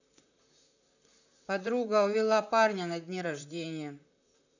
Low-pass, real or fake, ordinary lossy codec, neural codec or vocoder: 7.2 kHz; fake; none; vocoder, 44.1 kHz, 128 mel bands, Pupu-Vocoder